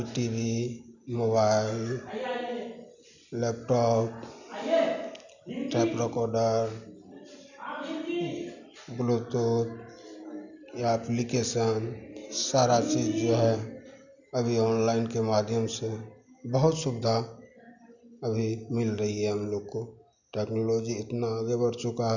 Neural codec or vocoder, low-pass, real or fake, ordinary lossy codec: none; 7.2 kHz; real; none